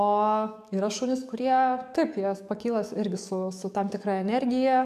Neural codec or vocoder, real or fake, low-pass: codec, 44.1 kHz, 7.8 kbps, DAC; fake; 14.4 kHz